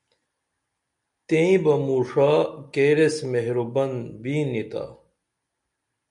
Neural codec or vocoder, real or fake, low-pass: none; real; 10.8 kHz